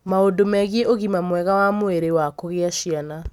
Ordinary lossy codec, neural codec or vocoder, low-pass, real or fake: none; none; 19.8 kHz; real